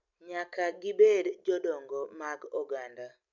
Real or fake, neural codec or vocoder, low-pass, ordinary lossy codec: real; none; 7.2 kHz; none